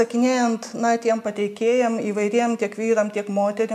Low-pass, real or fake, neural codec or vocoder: 14.4 kHz; real; none